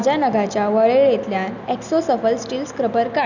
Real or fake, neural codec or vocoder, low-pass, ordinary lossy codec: real; none; 7.2 kHz; none